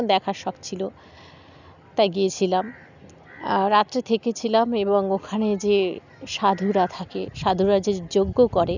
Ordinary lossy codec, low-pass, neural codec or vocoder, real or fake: none; 7.2 kHz; none; real